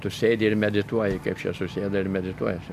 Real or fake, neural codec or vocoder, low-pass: real; none; 14.4 kHz